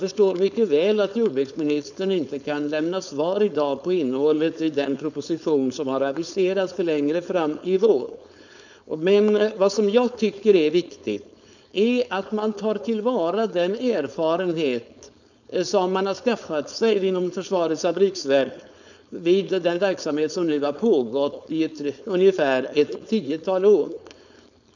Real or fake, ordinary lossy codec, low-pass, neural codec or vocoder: fake; none; 7.2 kHz; codec, 16 kHz, 4.8 kbps, FACodec